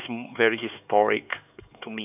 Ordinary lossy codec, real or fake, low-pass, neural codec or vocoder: none; fake; 3.6 kHz; codec, 16 kHz, 4 kbps, X-Codec, HuBERT features, trained on LibriSpeech